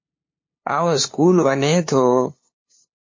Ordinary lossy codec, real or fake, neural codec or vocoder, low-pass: MP3, 32 kbps; fake; codec, 16 kHz, 2 kbps, FunCodec, trained on LibriTTS, 25 frames a second; 7.2 kHz